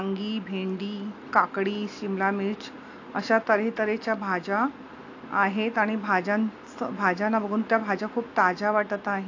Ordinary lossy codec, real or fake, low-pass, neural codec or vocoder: AAC, 48 kbps; real; 7.2 kHz; none